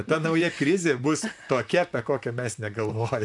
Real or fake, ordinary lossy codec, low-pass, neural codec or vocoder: fake; MP3, 96 kbps; 10.8 kHz; vocoder, 44.1 kHz, 128 mel bands, Pupu-Vocoder